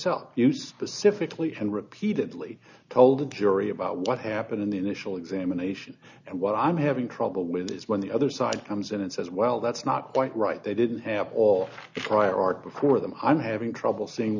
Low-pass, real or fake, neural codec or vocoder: 7.2 kHz; real; none